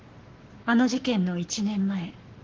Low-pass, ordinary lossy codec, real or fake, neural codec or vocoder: 7.2 kHz; Opus, 16 kbps; fake; codec, 44.1 kHz, 7.8 kbps, Pupu-Codec